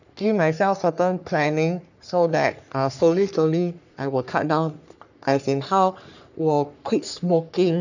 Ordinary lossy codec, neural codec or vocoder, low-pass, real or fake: none; codec, 44.1 kHz, 3.4 kbps, Pupu-Codec; 7.2 kHz; fake